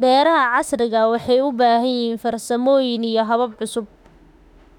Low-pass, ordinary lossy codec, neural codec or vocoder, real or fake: 19.8 kHz; none; autoencoder, 48 kHz, 32 numbers a frame, DAC-VAE, trained on Japanese speech; fake